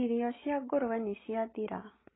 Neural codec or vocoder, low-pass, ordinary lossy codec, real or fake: none; 7.2 kHz; AAC, 16 kbps; real